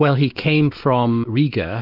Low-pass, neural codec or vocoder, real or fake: 5.4 kHz; autoencoder, 48 kHz, 128 numbers a frame, DAC-VAE, trained on Japanese speech; fake